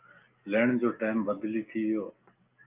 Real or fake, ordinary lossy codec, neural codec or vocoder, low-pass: real; Opus, 24 kbps; none; 3.6 kHz